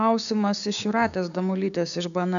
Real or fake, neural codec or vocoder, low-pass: fake; codec, 16 kHz, 6 kbps, DAC; 7.2 kHz